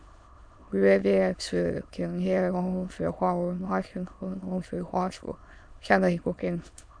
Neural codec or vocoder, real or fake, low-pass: autoencoder, 22.05 kHz, a latent of 192 numbers a frame, VITS, trained on many speakers; fake; 9.9 kHz